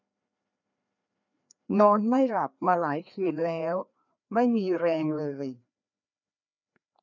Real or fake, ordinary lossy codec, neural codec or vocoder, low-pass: fake; none; codec, 16 kHz, 2 kbps, FreqCodec, larger model; 7.2 kHz